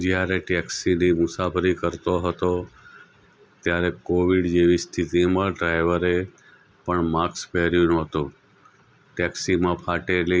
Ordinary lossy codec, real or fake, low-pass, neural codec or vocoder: none; real; none; none